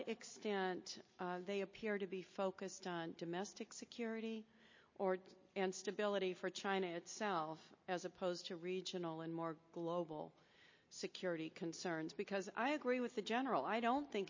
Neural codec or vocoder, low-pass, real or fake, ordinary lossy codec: none; 7.2 kHz; real; MP3, 32 kbps